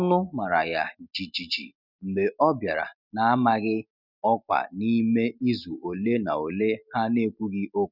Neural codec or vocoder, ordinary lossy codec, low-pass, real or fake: none; none; 5.4 kHz; real